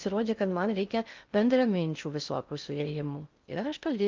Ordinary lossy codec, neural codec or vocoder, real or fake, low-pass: Opus, 24 kbps; codec, 16 kHz in and 24 kHz out, 0.6 kbps, FocalCodec, streaming, 2048 codes; fake; 7.2 kHz